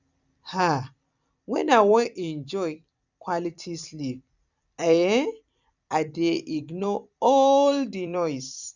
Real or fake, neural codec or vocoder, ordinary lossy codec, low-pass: fake; vocoder, 44.1 kHz, 128 mel bands every 256 samples, BigVGAN v2; none; 7.2 kHz